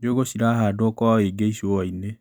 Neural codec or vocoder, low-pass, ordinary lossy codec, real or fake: none; none; none; real